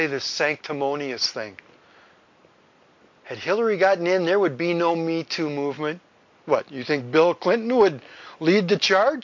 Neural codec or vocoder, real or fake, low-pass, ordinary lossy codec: none; real; 7.2 kHz; MP3, 48 kbps